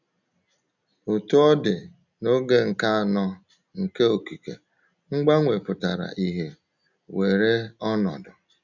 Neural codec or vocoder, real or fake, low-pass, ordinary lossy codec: none; real; 7.2 kHz; none